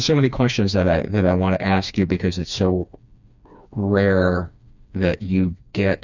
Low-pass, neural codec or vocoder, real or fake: 7.2 kHz; codec, 16 kHz, 2 kbps, FreqCodec, smaller model; fake